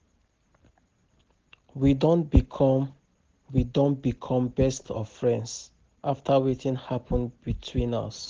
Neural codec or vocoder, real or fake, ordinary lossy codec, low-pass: none; real; Opus, 16 kbps; 7.2 kHz